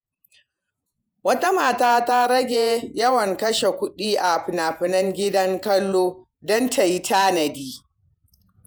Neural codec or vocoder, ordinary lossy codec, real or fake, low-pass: vocoder, 48 kHz, 128 mel bands, Vocos; none; fake; none